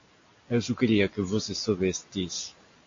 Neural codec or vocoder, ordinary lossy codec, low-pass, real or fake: none; AAC, 48 kbps; 7.2 kHz; real